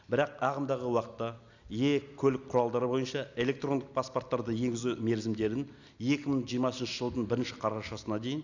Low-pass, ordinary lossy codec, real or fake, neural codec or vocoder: 7.2 kHz; none; real; none